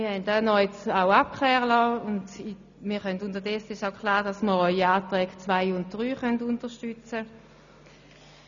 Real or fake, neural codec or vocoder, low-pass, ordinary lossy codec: real; none; 7.2 kHz; none